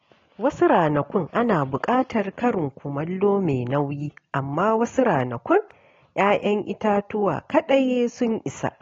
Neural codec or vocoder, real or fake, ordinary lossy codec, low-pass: none; real; AAC, 32 kbps; 7.2 kHz